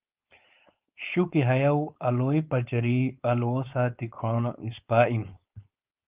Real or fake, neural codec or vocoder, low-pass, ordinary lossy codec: fake; codec, 16 kHz, 4.8 kbps, FACodec; 3.6 kHz; Opus, 32 kbps